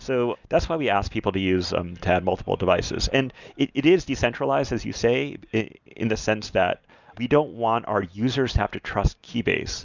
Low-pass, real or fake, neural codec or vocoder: 7.2 kHz; real; none